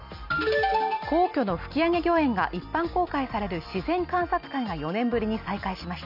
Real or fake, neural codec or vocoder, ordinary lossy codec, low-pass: real; none; none; 5.4 kHz